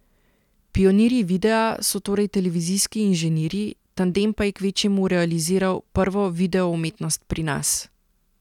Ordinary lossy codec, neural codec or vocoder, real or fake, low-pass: none; none; real; 19.8 kHz